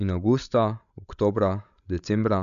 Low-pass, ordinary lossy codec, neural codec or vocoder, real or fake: 7.2 kHz; none; codec, 16 kHz, 16 kbps, FreqCodec, larger model; fake